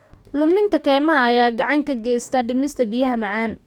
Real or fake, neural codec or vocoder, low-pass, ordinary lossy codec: fake; codec, 44.1 kHz, 2.6 kbps, DAC; 19.8 kHz; none